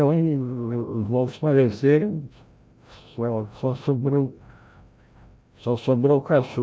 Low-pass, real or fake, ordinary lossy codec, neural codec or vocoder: none; fake; none; codec, 16 kHz, 0.5 kbps, FreqCodec, larger model